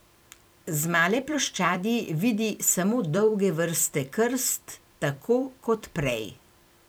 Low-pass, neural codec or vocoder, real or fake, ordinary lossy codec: none; vocoder, 44.1 kHz, 128 mel bands every 256 samples, BigVGAN v2; fake; none